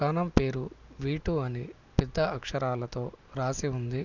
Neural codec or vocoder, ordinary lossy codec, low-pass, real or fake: none; none; 7.2 kHz; real